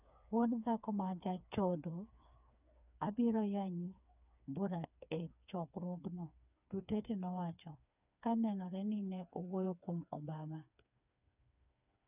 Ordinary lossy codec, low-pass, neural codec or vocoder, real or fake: none; 3.6 kHz; codec, 16 kHz, 4 kbps, FreqCodec, smaller model; fake